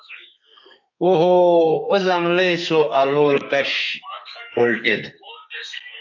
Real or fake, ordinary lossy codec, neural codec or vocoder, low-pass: fake; AAC, 48 kbps; codec, 32 kHz, 1.9 kbps, SNAC; 7.2 kHz